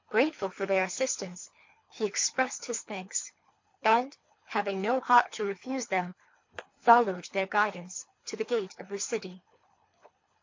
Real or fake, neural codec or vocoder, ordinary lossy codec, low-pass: fake; codec, 24 kHz, 3 kbps, HILCodec; MP3, 48 kbps; 7.2 kHz